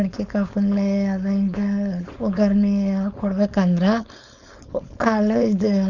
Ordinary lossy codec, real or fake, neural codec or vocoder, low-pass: none; fake; codec, 16 kHz, 4.8 kbps, FACodec; 7.2 kHz